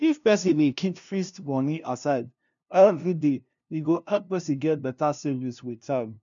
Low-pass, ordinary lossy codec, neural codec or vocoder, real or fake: 7.2 kHz; none; codec, 16 kHz, 0.5 kbps, FunCodec, trained on LibriTTS, 25 frames a second; fake